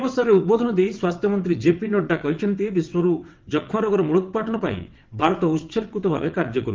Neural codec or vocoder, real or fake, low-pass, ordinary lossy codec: vocoder, 22.05 kHz, 80 mel bands, WaveNeXt; fake; 7.2 kHz; Opus, 32 kbps